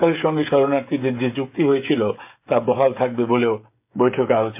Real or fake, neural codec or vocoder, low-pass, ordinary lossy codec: fake; codec, 16 kHz, 16 kbps, FreqCodec, smaller model; 3.6 kHz; MP3, 32 kbps